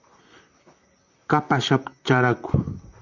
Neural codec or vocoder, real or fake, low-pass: vocoder, 44.1 kHz, 128 mel bands, Pupu-Vocoder; fake; 7.2 kHz